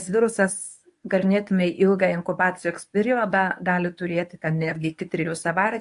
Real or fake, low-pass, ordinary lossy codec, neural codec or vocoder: fake; 10.8 kHz; AAC, 64 kbps; codec, 24 kHz, 0.9 kbps, WavTokenizer, medium speech release version 1